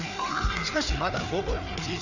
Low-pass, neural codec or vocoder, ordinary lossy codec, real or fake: 7.2 kHz; codec, 16 kHz, 4 kbps, FreqCodec, larger model; none; fake